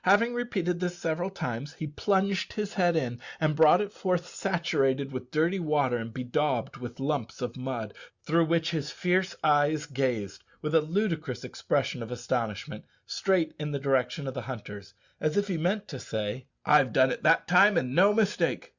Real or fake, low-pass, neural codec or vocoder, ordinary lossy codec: real; 7.2 kHz; none; Opus, 64 kbps